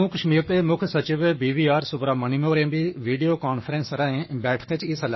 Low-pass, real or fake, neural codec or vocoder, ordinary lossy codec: 7.2 kHz; fake; codec, 44.1 kHz, 7.8 kbps, Pupu-Codec; MP3, 24 kbps